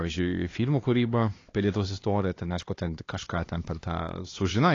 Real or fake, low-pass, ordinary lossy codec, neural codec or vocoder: fake; 7.2 kHz; AAC, 32 kbps; codec, 16 kHz, 2 kbps, X-Codec, HuBERT features, trained on LibriSpeech